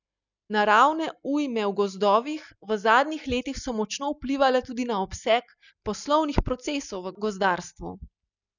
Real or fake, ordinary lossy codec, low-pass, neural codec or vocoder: real; none; 7.2 kHz; none